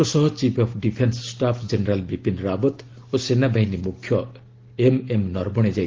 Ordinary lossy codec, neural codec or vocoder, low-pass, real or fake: Opus, 16 kbps; none; 7.2 kHz; real